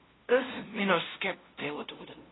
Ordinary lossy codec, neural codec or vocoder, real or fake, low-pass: AAC, 16 kbps; codec, 16 kHz, 0.5 kbps, FunCodec, trained on LibriTTS, 25 frames a second; fake; 7.2 kHz